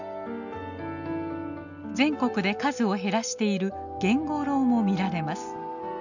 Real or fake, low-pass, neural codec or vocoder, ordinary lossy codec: real; 7.2 kHz; none; none